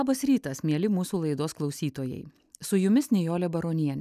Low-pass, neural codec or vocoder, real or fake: 14.4 kHz; none; real